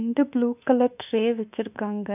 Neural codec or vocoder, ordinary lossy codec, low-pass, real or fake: autoencoder, 48 kHz, 32 numbers a frame, DAC-VAE, trained on Japanese speech; none; 3.6 kHz; fake